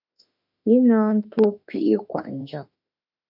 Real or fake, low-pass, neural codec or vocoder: fake; 5.4 kHz; autoencoder, 48 kHz, 32 numbers a frame, DAC-VAE, trained on Japanese speech